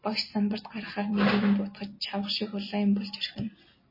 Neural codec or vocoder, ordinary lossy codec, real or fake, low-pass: none; MP3, 24 kbps; real; 5.4 kHz